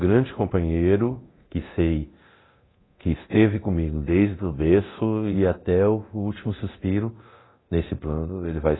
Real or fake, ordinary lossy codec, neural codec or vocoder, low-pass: fake; AAC, 16 kbps; codec, 24 kHz, 0.9 kbps, DualCodec; 7.2 kHz